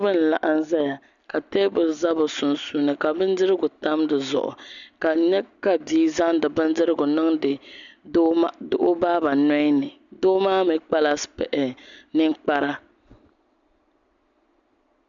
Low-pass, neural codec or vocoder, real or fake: 7.2 kHz; none; real